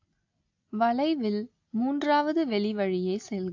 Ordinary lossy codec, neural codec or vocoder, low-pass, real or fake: AAC, 48 kbps; none; 7.2 kHz; real